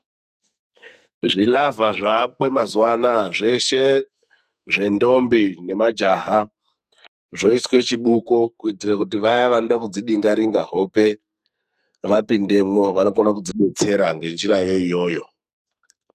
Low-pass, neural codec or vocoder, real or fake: 14.4 kHz; codec, 44.1 kHz, 2.6 kbps, SNAC; fake